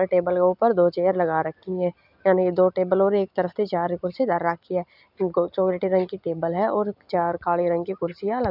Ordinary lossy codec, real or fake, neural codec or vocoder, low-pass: none; real; none; 5.4 kHz